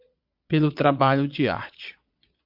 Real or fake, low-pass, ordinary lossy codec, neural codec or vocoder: fake; 5.4 kHz; MP3, 48 kbps; vocoder, 22.05 kHz, 80 mel bands, Vocos